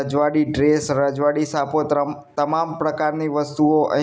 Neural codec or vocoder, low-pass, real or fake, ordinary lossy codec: none; none; real; none